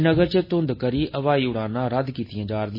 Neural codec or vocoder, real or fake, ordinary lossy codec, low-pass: none; real; MP3, 24 kbps; 5.4 kHz